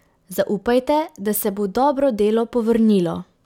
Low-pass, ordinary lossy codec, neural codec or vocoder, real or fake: 19.8 kHz; none; none; real